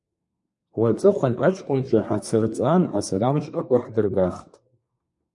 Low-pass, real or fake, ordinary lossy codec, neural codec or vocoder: 10.8 kHz; fake; MP3, 48 kbps; codec, 24 kHz, 1 kbps, SNAC